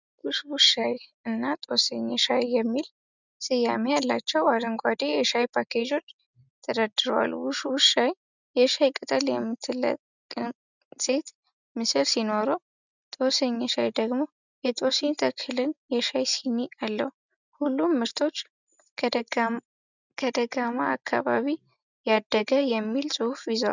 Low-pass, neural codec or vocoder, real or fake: 7.2 kHz; none; real